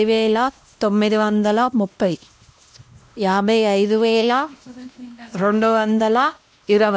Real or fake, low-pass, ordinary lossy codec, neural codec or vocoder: fake; none; none; codec, 16 kHz, 1 kbps, X-Codec, WavLM features, trained on Multilingual LibriSpeech